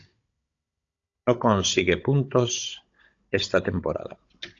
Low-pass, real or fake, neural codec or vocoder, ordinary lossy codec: 7.2 kHz; fake; codec, 16 kHz, 16 kbps, FunCodec, trained on Chinese and English, 50 frames a second; AAC, 48 kbps